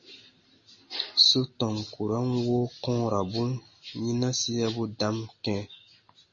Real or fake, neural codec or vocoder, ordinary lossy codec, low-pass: real; none; MP3, 32 kbps; 7.2 kHz